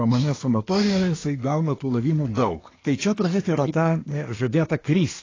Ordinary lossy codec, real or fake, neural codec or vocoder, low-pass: AAC, 32 kbps; fake; codec, 24 kHz, 1 kbps, SNAC; 7.2 kHz